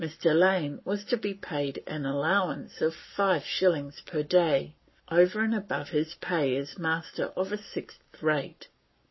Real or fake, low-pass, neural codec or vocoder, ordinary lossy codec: fake; 7.2 kHz; codec, 44.1 kHz, 7.8 kbps, Pupu-Codec; MP3, 24 kbps